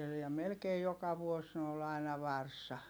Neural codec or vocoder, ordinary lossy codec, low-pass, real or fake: none; none; none; real